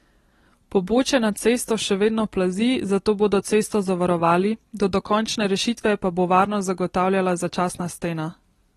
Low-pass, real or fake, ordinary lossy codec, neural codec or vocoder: 19.8 kHz; real; AAC, 32 kbps; none